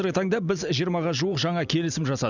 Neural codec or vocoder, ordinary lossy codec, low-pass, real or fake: none; none; 7.2 kHz; real